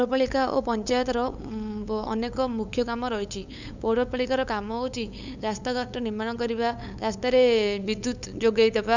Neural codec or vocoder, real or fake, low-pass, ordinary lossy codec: codec, 16 kHz, 8 kbps, FunCodec, trained on LibriTTS, 25 frames a second; fake; 7.2 kHz; none